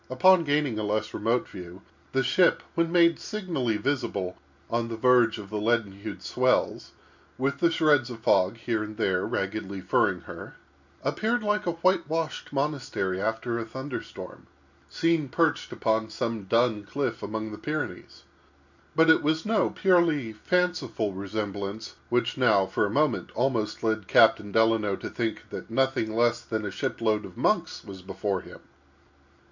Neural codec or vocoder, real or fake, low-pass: none; real; 7.2 kHz